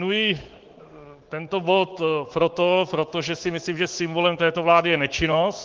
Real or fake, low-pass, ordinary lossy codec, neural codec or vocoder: fake; 7.2 kHz; Opus, 16 kbps; codec, 24 kHz, 3.1 kbps, DualCodec